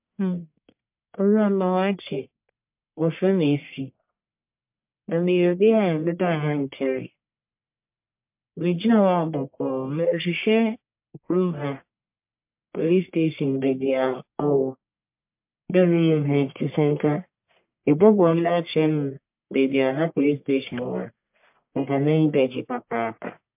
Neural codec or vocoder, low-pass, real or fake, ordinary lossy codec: codec, 44.1 kHz, 1.7 kbps, Pupu-Codec; 3.6 kHz; fake; MP3, 32 kbps